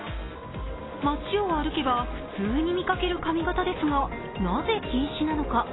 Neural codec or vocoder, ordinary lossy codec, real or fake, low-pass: none; AAC, 16 kbps; real; 7.2 kHz